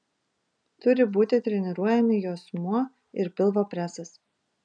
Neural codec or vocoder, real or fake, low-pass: none; real; 9.9 kHz